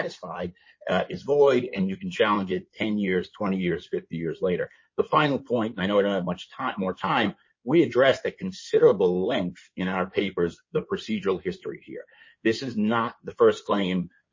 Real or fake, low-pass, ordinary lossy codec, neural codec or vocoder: fake; 7.2 kHz; MP3, 32 kbps; codec, 16 kHz in and 24 kHz out, 2.2 kbps, FireRedTTS-2 codec